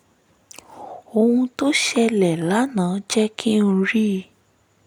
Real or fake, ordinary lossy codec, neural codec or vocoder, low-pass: real; none; none; 19.8 kHz